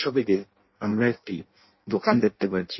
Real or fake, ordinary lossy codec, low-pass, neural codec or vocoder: fake; MP3, 24 kbps; 7.2 kHz; codec, 16 kHz in and 24 kHz out, 0.6 kbps, FireRedTTS-2 codec